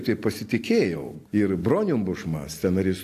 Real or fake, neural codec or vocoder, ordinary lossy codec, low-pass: real; none; AAC, 64 kbps; 14.4 kHz